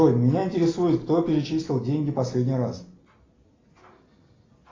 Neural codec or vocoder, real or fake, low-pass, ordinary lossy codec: none; real; 7.2 kHz; AAC, 32 kbps